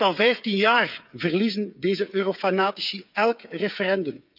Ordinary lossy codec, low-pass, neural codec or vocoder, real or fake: none; 5.4 kHz; codec, 16 kHz, 8 kbps, FreqCodec, smaller model; fake